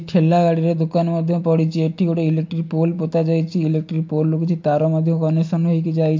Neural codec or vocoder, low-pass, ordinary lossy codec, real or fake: none; 7.2 kHz; MP3, 48 kbps; real